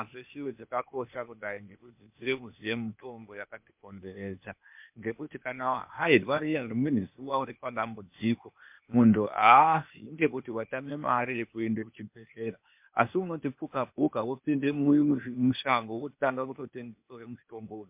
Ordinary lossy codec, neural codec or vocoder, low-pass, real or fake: MP3, 32 kbps; codec, 16 kHz, 0.8 kbps, ZipCodec; 3.6 kHz; fake